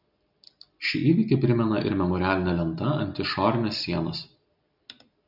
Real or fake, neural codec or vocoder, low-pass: real; none; 5.4 kHz